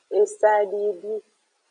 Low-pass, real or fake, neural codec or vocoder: 9.9 kHz; real; none